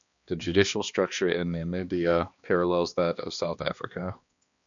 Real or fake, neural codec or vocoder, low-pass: fake; codec, 16 kHz, 2 kbps, X-Codec, HuBERT features, trained on balanced general audio; 7.2 kHz